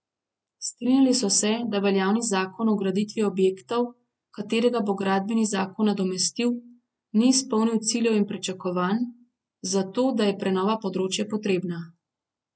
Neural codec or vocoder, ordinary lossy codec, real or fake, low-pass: none; none; real; none